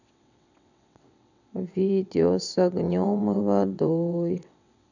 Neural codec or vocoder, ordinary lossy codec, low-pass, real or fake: vocoder, 22.05 kHz, 80 mel bands, WaveNeXt; none; 7.2 kHz; fake